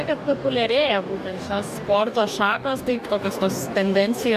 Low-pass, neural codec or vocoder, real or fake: 14.4 kHz; codec, 44.1 kHz, 2.6 kbps, DAC; fake